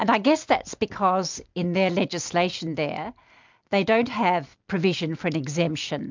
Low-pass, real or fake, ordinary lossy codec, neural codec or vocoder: 7.2 kHz; real; MP3, 64 kbps; none